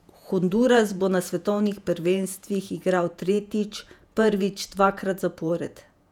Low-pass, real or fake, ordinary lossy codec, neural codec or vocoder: 19.8 kHz; fake; none; vocoder, 48 kHz, 128 mel bands, Vocos